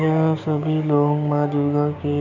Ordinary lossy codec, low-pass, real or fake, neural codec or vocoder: none; 7.2 kHz; fake; codec, 16 kHz, 6 kbps, DAC